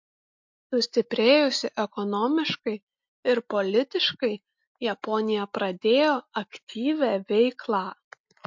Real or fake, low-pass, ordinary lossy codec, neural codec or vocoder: real; 7.2 kHz; MP3, 48 kbps; none